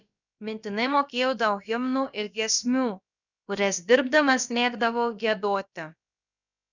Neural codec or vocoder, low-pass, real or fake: codec, 16 kHz, about 1 kbps, DyCAST, with the encoder's durations; 7.2 kHz; fake